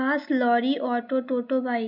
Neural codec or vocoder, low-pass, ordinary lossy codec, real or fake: none; 5.4 kHz; none; real